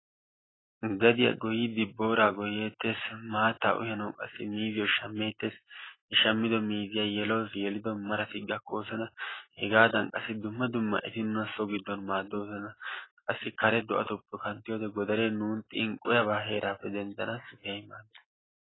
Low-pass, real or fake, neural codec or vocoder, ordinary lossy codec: 7.2 kHz; real; none; AAC, 16 kbps